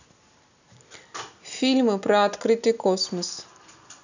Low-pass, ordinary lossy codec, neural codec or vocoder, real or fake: 7.2 kHz; none; none; real